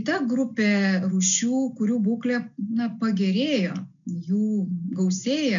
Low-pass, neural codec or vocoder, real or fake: 7.2 kHz; none; real